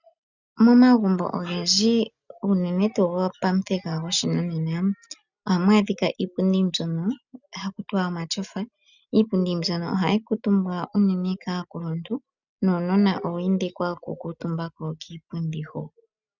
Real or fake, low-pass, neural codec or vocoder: real; 7.2 kHz; none